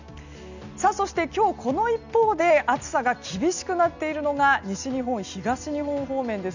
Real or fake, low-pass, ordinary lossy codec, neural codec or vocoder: real; 7.2 kHz; none; none